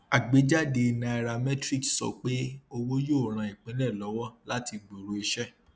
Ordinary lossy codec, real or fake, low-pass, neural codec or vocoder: none; real; none; none